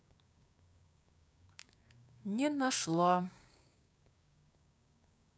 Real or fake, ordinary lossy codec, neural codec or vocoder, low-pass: fake; none; codec, 16 kHz, 6 kbps, DAC; none